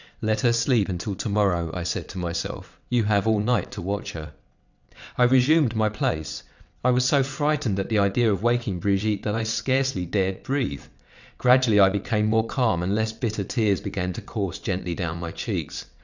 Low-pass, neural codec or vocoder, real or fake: 7.2 kHz; vocoder, 22.05 kHz, 80 mel bands, WaveNeXt; fake